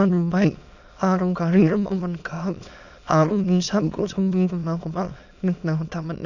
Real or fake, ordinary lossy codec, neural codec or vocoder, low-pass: fake; none; autoencoder, 22.05 kHz, a latent of 192 numbers a frame, VITS, trained on many speakers; 7.2 kHz